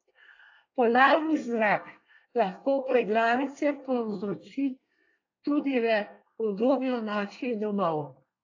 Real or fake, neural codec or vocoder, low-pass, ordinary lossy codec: fake; codec, 24 kHz, 1 kbps, SNAC; 7.2 kHz; AAC, 48 kbps